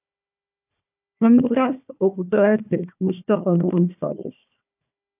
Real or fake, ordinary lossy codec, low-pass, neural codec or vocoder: fake; AAC, 32 kbps; 3.6 kHz; codec, 16 kHz, 1 kbps, FunCodec, trained on Chinese and English, 50 frames a second